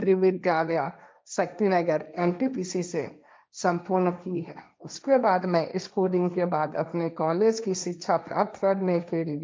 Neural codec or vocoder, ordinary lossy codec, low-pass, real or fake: codec, 16 kHz, 1.1 kbps, Voila-Tokenizer; none; none; fake